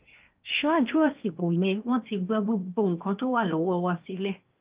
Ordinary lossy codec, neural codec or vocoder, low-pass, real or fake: Opus, 32 kbps; codec, 16 kHz in and 24 kHz out, 0.8 kbps, FocalCodec, streaming, 65536 codes; 3.6 kHz; fake